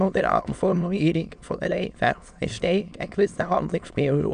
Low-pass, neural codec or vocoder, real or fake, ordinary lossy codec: 9.9 kHz; autoencoder, 22.05 kHz, a latent of 192 numbers a frame, VITS, trained on many speakers; fake; none